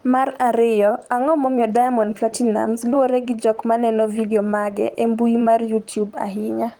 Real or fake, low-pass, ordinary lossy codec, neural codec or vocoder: fake; 19.8 kHz; Opus, 24 kbps; vocoder, 44.1 kHz, 128 mel bands, Pupu-Vocoder